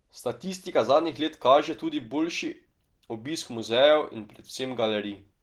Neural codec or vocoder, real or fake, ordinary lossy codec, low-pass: none; real; Opus, 16 kbps; 19.8 kHz